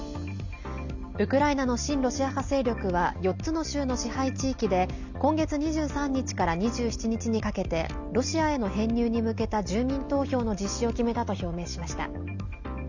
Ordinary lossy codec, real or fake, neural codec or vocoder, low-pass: none; real; none; 7.2 kHz